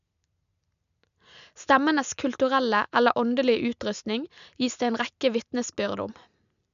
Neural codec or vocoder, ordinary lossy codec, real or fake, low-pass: none; none; real; 7.2 kHz